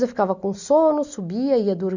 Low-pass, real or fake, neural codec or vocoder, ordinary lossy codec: 7.2 kHz; real; none; none